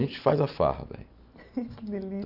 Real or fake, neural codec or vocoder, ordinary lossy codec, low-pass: real; none; AAC, 48 kbps; 5.4 kHz